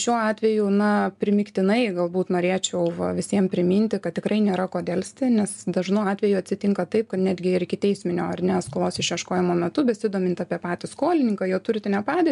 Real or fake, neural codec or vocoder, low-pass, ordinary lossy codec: real; none; 10.8 kHz; MP3, 96 kbps